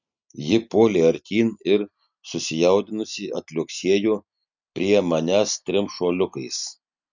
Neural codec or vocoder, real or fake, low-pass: none; real; 7.2 kHz